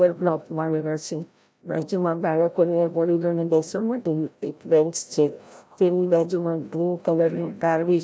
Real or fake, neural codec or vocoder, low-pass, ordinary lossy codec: fake; codec, 16 kHz, 0.5 kbps, FreqCodec, larger model; none; none